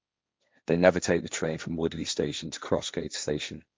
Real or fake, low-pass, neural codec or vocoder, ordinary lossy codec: fake; none; codec, 16 kHz, 1.1 kbps, Voila-Tokenizer; none